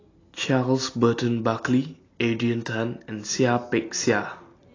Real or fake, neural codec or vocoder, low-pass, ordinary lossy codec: real; none; 7.2 kHz; AAC, 32 kbps